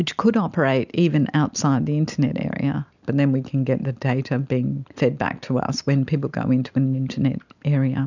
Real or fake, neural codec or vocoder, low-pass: real; none; 7.2 kHz